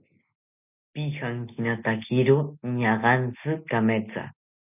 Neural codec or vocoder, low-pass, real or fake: none; 3.6 kHz; real